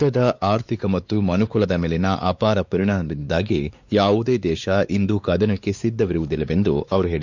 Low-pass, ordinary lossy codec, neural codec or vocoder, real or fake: 7.2 kHz; Opus, 64 kbps; codec, 16 kHz, 4 kbps, X-Codec, WavLM features, trained on Multilingual LibriSpeech; fake